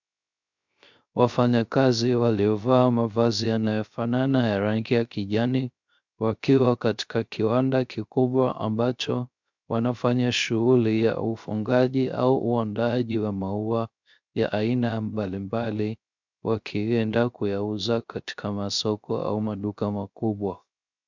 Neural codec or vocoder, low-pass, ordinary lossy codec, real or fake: codec, 16 kHz, 0.3 kbps, FocalCodec; 7.2 kHz; MP3, 64 kbps; fake